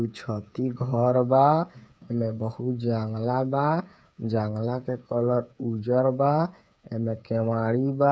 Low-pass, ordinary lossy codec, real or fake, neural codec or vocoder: none; none; fake; codec, 16 kHz, 8 kbps, FreqCodec, smaller model